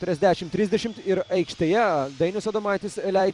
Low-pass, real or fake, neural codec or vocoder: 10.8 kHz; fake; vocoder, 44.1 kHz, 128 mel bands every 256 samples, BigVGAN v2